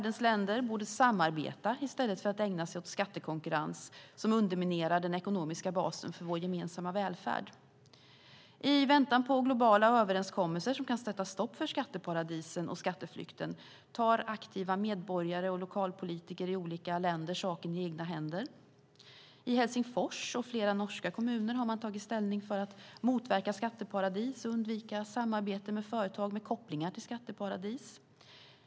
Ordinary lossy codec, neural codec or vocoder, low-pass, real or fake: none; none; none; real